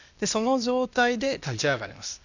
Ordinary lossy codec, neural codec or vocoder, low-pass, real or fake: none; codec, 16 kHz, 0.8 kbps, ZipCodec; 7.2 kHz; fake